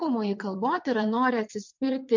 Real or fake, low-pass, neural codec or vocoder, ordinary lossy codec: fake; 7.2 kHz; vocoder, 22.05 kHz, 80 mel bands, WaveNeXt; MP3, 48 kbps